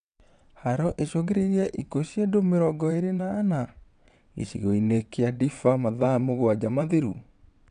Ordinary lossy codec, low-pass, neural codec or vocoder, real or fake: none; 9.9 kHz; vocoder, 22.05 kHz, 80 mel bands, WaveNeXt; fake